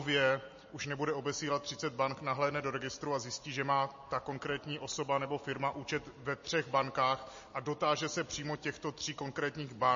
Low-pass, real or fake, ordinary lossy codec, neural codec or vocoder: 7.2 kHz; real; MP3, 32 kbps; none